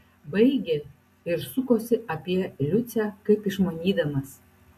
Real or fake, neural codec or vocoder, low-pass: real; none; 14.4 kHz